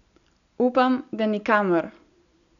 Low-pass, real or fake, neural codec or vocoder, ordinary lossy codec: 7.2 kHz; real; none; none